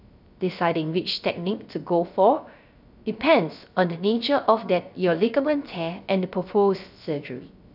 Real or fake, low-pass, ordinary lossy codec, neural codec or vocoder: fake; 5.4 kHz; none; codec, 16 kHz, 0.3 kbps, FocalCodec